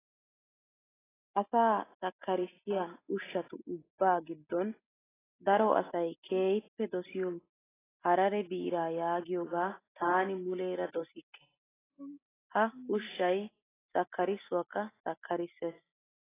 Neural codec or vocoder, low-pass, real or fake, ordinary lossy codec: none; 3.6 kHz; real; AAC, 16 kbps